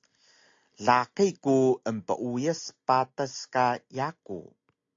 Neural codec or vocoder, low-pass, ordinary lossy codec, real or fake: none; 7.2 kHz; AAC, 48 kbps; real